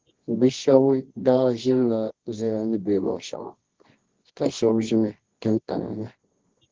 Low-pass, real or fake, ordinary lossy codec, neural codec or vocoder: 7.2 kHz; fake; Opus, 16 kbps; codec, 24 kHz, 0.9 kbps, WavTokenizer, medium music audio release